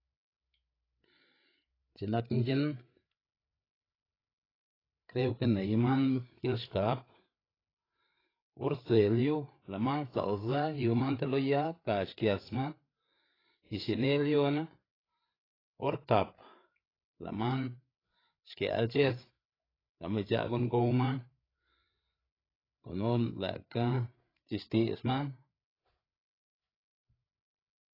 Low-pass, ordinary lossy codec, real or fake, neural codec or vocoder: 5.4 kHz; AAC, 24 kbps; fake; codec, 16 kHz, 8 kbps, FreqCodec, larger model